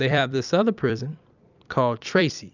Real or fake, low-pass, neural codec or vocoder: fake; 7.2 kHz; vocoder, 44.1 kHz, 128 mel bands every 256 samples, BigVGAN v2